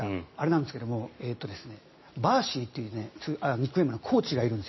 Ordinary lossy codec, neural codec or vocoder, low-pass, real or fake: MP3, 24 kbps; none; 7.2 kHz; real